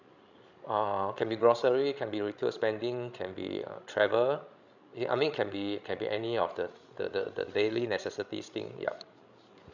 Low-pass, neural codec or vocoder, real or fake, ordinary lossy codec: 7.2 kHz; codec, 16 kHz, 16 kbps, FreqCodec, larger model; fake; none